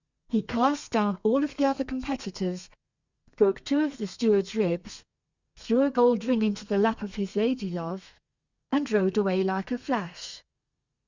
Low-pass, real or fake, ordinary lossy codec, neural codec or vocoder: 7.2 kHz; fake; Opus, 64 kbps; codec, 32 kHz, 1.9 kbps, SNAC